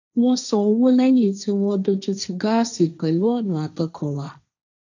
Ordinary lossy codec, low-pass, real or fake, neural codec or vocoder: none; 7.2 kHz; fake; codec, 16 kHz, 1.1 kbps, Voila-Tokenizer